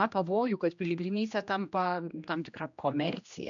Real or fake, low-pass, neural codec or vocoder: fake; 7.2 kHz; codec, 16 kHz, 1 kbps, X-Codec, HuBERT features, trained on general audio